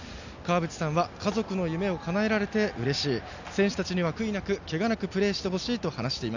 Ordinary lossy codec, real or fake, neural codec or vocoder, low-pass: none; real; none; 7.2 kHz